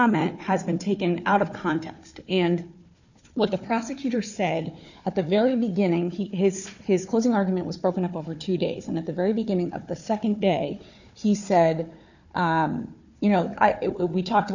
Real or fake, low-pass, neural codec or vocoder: fake; 7.2 kHz; codec, 16 kHz, 4 kbps, FunCodec, trained on Chinese and English, 50 frames a second